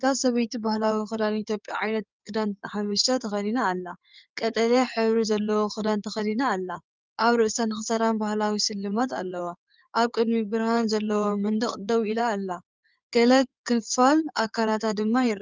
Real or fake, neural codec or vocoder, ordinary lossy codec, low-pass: fake; codec, 16 kHz in and 24 kHz out, 2.2 kbps, FireRedTTS-2 codec; Opus, 24 kbps; 7.2 kHz